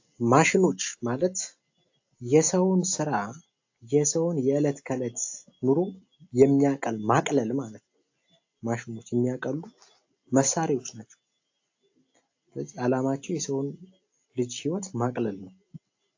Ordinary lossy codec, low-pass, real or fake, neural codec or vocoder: AAC, 48 kbps; 7.2 kHz; real; none